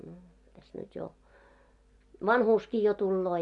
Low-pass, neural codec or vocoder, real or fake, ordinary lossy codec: 10.8 kHz; none; real; MP3, 64 kbps